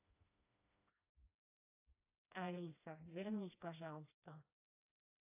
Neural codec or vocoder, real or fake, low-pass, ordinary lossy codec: codec, 16 kHz, 1 kbps, FreqCodec, smaller model; fake; 3.6 kHz; AAC, 32 kbps